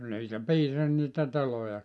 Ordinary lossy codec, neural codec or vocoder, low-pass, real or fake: none; none; none; real